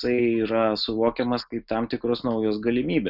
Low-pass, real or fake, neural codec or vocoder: 5.4 kHz; real; none